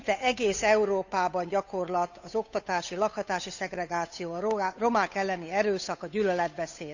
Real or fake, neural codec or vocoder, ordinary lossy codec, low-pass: fake; codec, 16 kHz, 8 kbps, FunCodec, trained on Chinese and English, 25 frames a second; none; 7.2 kHz